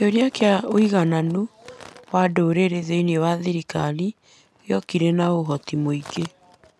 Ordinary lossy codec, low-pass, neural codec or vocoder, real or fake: none; none; none; real